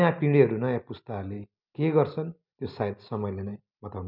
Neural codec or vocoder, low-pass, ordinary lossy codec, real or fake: none; 5.4 kHz; none; real